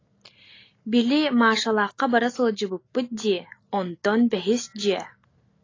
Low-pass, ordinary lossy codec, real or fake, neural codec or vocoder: 7.2 kHz; AAC, 32 kbps; real; none